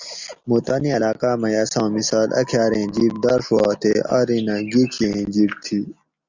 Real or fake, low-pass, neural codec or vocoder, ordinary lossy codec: real; 7.2 kHz; none; Opus, 64 kbps